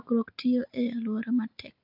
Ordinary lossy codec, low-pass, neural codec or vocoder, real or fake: AAC, 48 kbps; 5.4 kHz; none; real